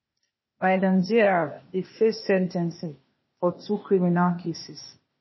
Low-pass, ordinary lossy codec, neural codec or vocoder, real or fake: 7.2 kHz; MP3, 24 kbps; codec, 16 kHz, 0.8 kbps, ZipCodec; fake